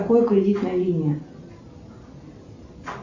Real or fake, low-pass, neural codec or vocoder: real; 7.2 kHz; none